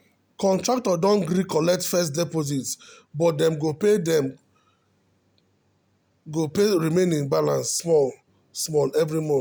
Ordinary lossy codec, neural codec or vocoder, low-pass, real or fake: none; none; none; real